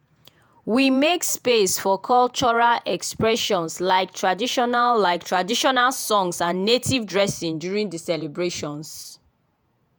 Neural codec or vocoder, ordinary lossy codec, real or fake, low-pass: vocoder, 48 kHz, 128 mel bands, Vocos; none; fake; none